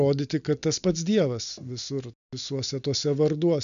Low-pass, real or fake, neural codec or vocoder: 7.2 kHz; real; none